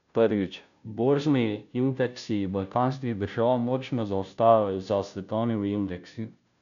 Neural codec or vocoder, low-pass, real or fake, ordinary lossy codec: codec, 16 kHz, 0.5 kbps, FunCodec, trained on Chinese and English, 25 frames a second; 7.2 kHz; fake; none